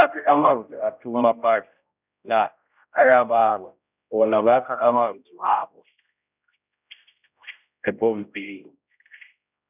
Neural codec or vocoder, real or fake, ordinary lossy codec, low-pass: codec, 16 kHz, 0.5 kbps, X-Codec, HuBERT features, trained on general audio; fake; none; 3.6 kHz